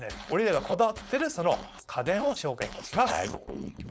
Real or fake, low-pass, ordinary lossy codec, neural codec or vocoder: fake; none; none; codec, 16 kHz, 4.8 kbps, FACodec